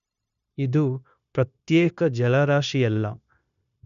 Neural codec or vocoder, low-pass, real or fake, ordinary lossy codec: codec, 16 kHz, 0.9 kbps, LongCat-Audio-Codec; 7.2 kHz; fake; none